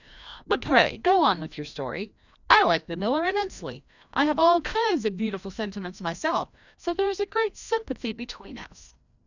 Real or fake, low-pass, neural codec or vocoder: fake; 7.2 kHz; codec, 16 kHz, 1 kbps, FreqCodec, larger model